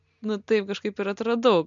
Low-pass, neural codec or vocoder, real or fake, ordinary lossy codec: 7.2 kHz; none; real; MP3, 64 kbps